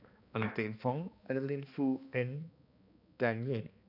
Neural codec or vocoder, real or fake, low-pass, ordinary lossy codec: codec, 16 kHz, 2 kbps, X-Codec, HuBERT features, trained on balanced general audio; fake; 5.4 kHz; none